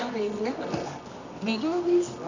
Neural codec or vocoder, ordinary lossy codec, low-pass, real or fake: codec, 24 kHz, 0.9 kbps, WavTokenizer, medium music audio release; none; 7.2 kHz; fake